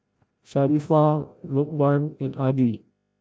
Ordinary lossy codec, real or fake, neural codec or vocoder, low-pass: none; fake; codec, 16 kHz, 0.5 kbps, FreqCodec, larger model; none